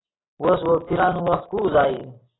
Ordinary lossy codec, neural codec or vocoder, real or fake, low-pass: AAC, 16 kbps; none; real; 7.2 kHz